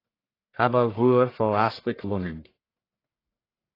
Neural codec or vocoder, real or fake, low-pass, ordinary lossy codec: codec, 44.1 kHz, 1.7 kbps, Pupu-Codec; fake; 5.4 kHz; AAC, 24 kbps